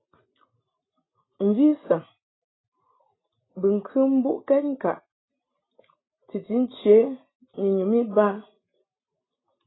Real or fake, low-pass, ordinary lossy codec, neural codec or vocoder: real; 7.2 kHz; AAC, 16 kbps; none